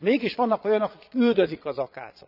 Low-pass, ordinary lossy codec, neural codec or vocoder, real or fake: 5.4 kHz; none; vocoder, 22.05 kHz, 80 mel bands, Vocos; fake